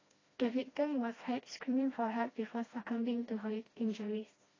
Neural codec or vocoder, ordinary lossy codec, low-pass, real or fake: codec, 16 kHz, 1 kbps, FreqCodec, smaller model; AAC, 32 kbps; 7.2 kHz; fake